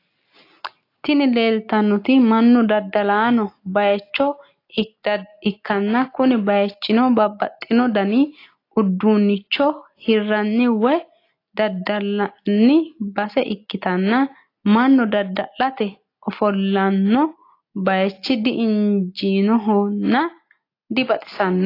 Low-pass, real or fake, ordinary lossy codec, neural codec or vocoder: 5.4 kHz; real; AAC, 32 kbps; none